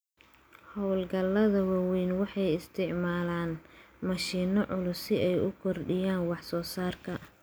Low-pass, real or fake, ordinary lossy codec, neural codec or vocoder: none; real; none; none